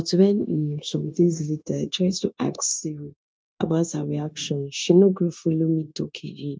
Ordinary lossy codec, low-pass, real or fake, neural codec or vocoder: none; none; fake; codec, 16 kHz, 0.9 kbps, LongCat-Audio-Codec